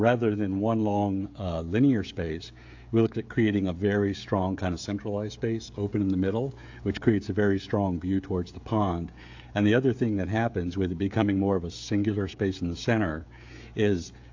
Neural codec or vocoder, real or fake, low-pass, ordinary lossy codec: codec, 16 kHz, 16 kbps, FreqCodec, smaller model; fake; 7.2 kHz; AAC, 48 kbps